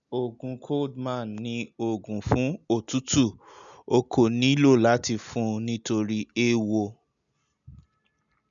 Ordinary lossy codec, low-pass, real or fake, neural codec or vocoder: none; 7.2 kHz; real; none